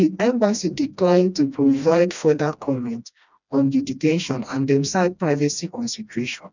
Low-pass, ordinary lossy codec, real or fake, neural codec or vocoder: 7.2 kHz; none; fake; codec, 16 kHz, 1 kbps, FreqCodec, smaller model